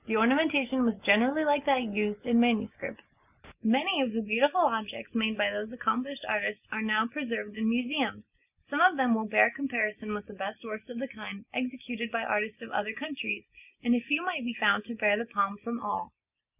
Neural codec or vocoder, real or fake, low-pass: none; real; 3.6 kHz